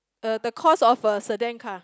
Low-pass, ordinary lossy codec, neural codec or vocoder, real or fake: none; none; none; real